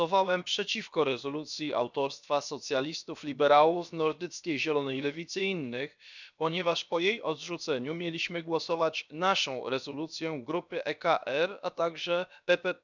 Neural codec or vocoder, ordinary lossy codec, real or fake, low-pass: codec, 16 kHz, about 1 kbps, DyCAST, with the encoder's durations; none; fake; 7.2 kHz